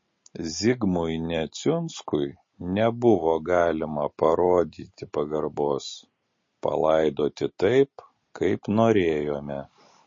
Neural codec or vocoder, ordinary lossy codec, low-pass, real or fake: none; MP3, 32 kbps; 7.2 kHz; real